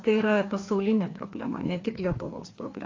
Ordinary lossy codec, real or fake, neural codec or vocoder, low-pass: AAC, 32 kbps; fake; codec, 16 kHz, 2 kbps, FreqCodec, larger model; 7.2 kHz